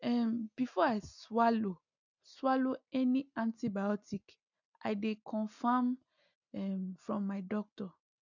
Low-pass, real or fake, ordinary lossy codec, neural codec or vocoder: 7.2 kHz; real; none; none